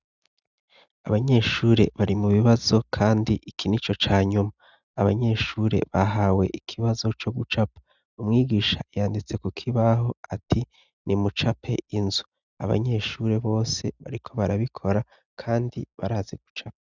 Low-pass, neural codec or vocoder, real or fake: 7.2 kHz; none; real